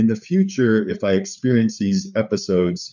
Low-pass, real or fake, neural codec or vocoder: 7.2 kHz; fake; codec, 16 kHz, 4 kbps, FreqCodec, larger model